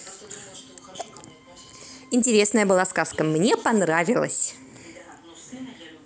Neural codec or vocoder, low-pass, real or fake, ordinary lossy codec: none; none; real; none